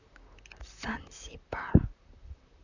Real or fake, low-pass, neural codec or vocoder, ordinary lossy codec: fake; 7.2 kHz; vocoder, 44.1 kHz, 128 mel bands, Pupu-Vocoder; none